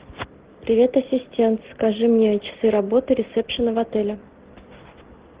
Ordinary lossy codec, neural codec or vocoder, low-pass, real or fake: Opus, 16 kbps; none; 3.6 kHz; real